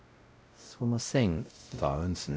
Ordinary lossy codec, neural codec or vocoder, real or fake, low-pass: none; codec, 16 kHz, 0.5 kbps, X-Codec, WavLM features, trained on Multilingual LibriSpeech; fake; none